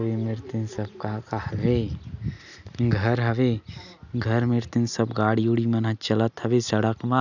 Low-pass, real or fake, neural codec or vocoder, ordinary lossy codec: 7.2 kHz; real; none; none